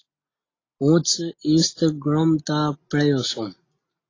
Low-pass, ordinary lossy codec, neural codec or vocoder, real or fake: 7.2 kHz; AAC, 32 kbps; none; real